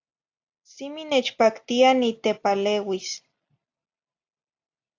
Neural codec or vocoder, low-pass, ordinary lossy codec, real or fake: none; 7.2 kHz; AAC, 48 kbps; real